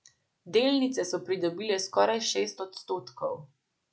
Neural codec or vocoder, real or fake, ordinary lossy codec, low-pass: none; real; none; none